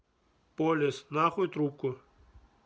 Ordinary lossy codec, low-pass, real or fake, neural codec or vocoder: none; none; real; none